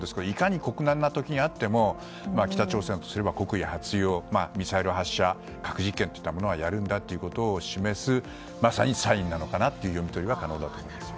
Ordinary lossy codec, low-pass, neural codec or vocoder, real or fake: none; none; none; real